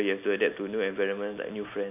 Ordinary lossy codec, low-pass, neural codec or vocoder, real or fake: none; 3.6 kHz; none; real